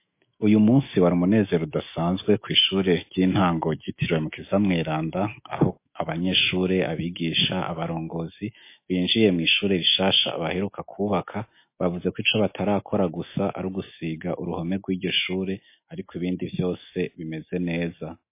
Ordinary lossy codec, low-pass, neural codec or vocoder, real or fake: MP3, 24 kbps; 3.6 kHz; autoencoder, 48 kHz, 128 numbers a frame, DAC-VAE, trained on Japanese speech; fake